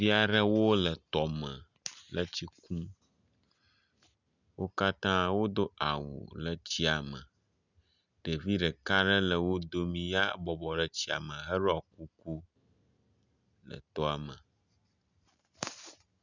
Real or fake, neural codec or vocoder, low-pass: real; none; 7.2 kHz